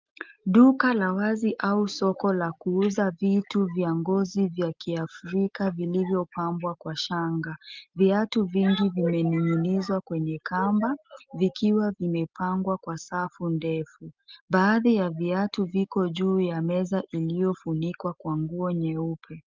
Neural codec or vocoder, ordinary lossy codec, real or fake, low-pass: none; Opus, 24 kbps; real; 7.2 kHz